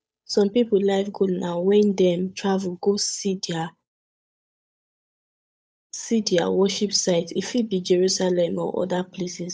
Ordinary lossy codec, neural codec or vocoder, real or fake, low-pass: none; codec, 16 kHz, 8 kbps, FunCodec, trained on Chinese and English, 25 frames a second; fake; none